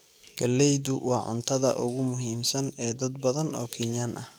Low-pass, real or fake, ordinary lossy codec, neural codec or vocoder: none; fake; none; codec, 44.1 kHz, 7.8 kbps, DAC